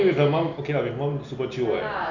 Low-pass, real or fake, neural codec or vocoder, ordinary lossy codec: 7.2 kHz; real; none; none